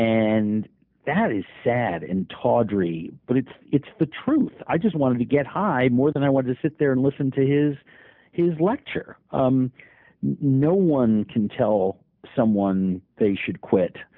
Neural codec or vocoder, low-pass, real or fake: none; 5.4 kHz; real